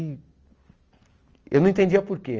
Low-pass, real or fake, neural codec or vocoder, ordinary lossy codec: 7.2 kHz; real; none; Opus, 24 kbps